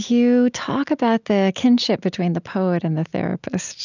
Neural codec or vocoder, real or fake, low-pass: none; real; 7.2 kHz